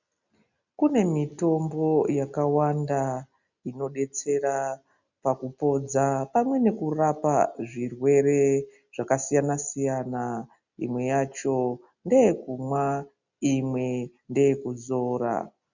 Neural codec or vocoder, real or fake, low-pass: none; real; 7.2 kHz